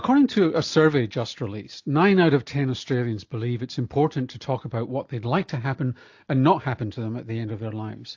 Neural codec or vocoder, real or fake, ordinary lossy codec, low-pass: none; real; AAC, 48 kbps; 7.2 kHz